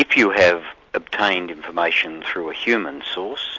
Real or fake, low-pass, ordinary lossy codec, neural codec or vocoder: real; 7.2 kHz; AAC, 48 kbps; none